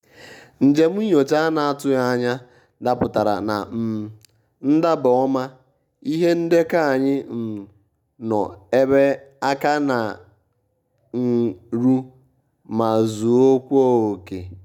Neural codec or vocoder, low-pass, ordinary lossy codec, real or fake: none; 19.8 kHz; none; real